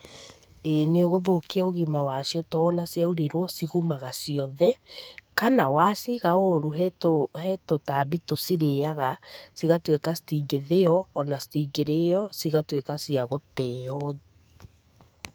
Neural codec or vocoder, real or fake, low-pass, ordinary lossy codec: codec, 44.1 kHz, 2.6 kbps, SNAC; fake; none; none